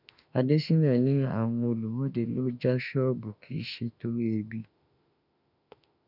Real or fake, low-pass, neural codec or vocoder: fake; 5.4 kHz; autoencoder, 48 kHz, 32 numbers a frame, DAC-VAE, trained on Japanese speech